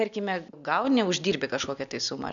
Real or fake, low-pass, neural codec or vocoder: real; 7.2 kHz; none